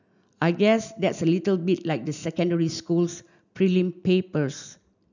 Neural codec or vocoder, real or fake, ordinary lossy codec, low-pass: none; real; none; 7.2 kHz